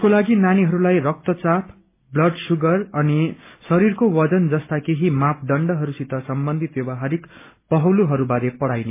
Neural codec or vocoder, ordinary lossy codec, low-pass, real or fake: none; MP3, 24 kbps; 3.6 kHz; real